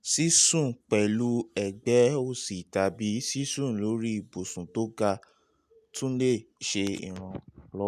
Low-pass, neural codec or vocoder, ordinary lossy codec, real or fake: 14.4 kHz; none; none; real